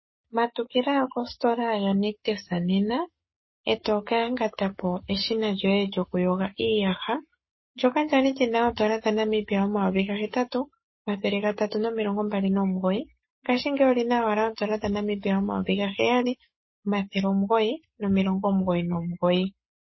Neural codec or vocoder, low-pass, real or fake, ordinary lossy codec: codec, 44.1 kHz, 7.8 kbps, Pupu-Codec; 7.2 kHz; fake; MP3, 24 kbps